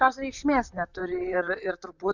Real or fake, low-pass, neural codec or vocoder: fake; 7.2 kHz; vocoder, 44.1 kHz, 128 mel bands, Pupu-Vocoder